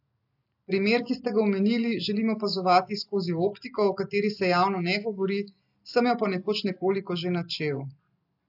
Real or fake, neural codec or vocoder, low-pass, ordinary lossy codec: real; none; 5.4 kHz; AAC, 48 kbps